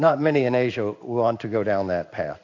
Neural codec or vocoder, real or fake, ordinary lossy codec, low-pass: codec, 16 kHz in and 24 kHz out, 1 kbps, XY-Tokenizer; fake; AAC, 48 kbps; 7.2 kHz